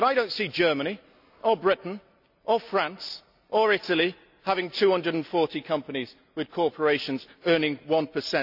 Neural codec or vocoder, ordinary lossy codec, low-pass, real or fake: none; none; 5.4 kHz; real